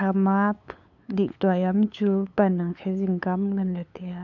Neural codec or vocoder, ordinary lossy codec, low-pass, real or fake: codec, 16 kHz, 8 kbps, FunCodec, trained on LibriTTS, 25 frames a second; none; 7.2 kHz; fake